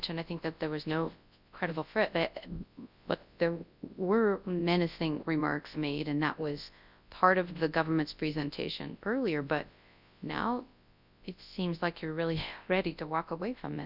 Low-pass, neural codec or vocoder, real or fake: 5.4 kHz; codec, 24 kHz, 0.9 kbps, WavTokenizer, large speech release; fake